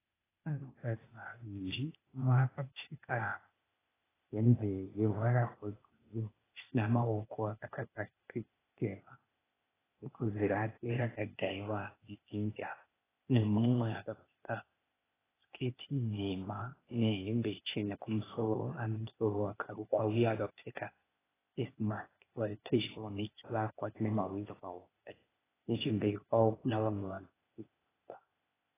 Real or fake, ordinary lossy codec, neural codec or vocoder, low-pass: fake; AAC, 16 kbps; codec, 16 kHz, 0.8 kbps, ZipCodec; 3.6 kHz